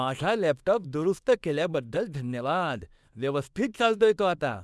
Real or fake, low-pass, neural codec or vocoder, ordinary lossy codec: fake; none; codec, 24 kHz, 0.9 kbps, WavTokenizer, small release; none